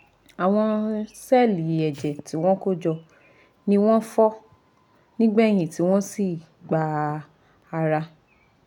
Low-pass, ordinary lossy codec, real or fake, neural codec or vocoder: 19.8 kHz; none; real; none